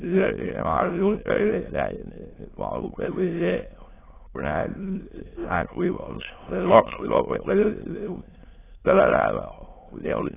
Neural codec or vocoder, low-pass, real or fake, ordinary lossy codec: autoencoder, 22.05 kHz, a latent of 192 numbers a frame, VITS, trained on many speakers; 3.6 kHz; fake; AAC, 16 kbps